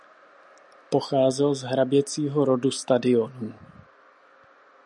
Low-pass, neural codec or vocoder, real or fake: 10.8 kHz; none; real